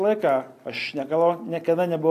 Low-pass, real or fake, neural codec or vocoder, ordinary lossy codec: 14.4 kHz; real; none; AAC, 64 kbps